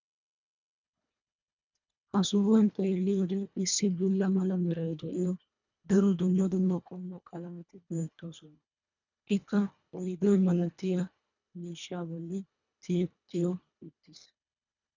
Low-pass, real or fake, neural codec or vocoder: 7.2 kHz; fake; codec, 24 kHz, 1.5 kbps, HILCodec